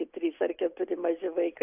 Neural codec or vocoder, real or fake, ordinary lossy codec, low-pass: none; real; AAC, 32 kbps; 3.6 kHz